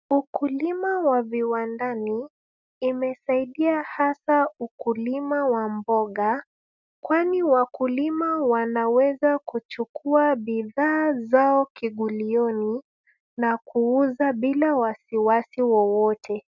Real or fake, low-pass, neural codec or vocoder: real; 7.2 kHz; none